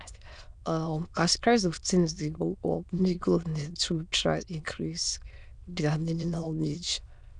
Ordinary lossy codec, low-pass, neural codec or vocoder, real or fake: none; 9.9 kHz; autoencoder, 22.05 kHz, a latent of 192 numbers a frame, VITS, trained on many speakers; fake